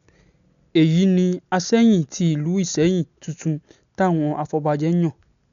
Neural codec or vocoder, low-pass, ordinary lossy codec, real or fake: none; 7.2 kHz; none; real